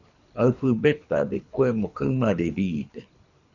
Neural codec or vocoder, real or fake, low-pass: codec, 24 kHz, 3 kbps, HILCodec; fake; 7.2 kHz